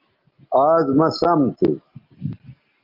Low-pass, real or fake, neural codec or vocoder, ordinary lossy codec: 5.4 kHz; real; none; Opus, 32 kbps